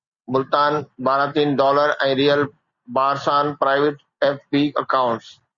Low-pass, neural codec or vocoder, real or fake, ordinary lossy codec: 7.2 kHz; none; real; Opus, 64 kbps